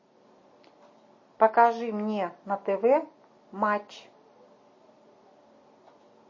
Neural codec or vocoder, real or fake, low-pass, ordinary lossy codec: none; real; 7.2 kHz; MP3, 32 kbps